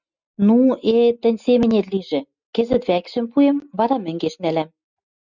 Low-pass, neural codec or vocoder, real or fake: 7.2 kHz; none; real